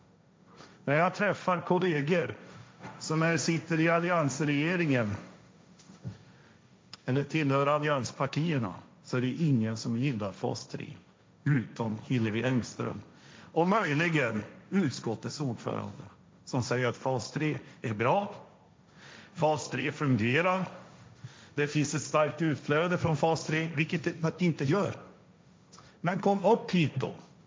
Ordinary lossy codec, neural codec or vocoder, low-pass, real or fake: none; codec, 16 kHz, 1.1 kbps, Voila-Tokenizer; none; fake